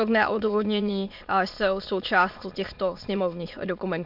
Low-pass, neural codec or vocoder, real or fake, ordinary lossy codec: 5.4 kHz; autoencoder, 22.05 kHz, a latent of 192 numbers a frame, VITS, trained on many speakers; fake; MP3, 48 kbps